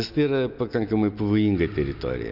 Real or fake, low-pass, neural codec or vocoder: real; 5.4 kHz; none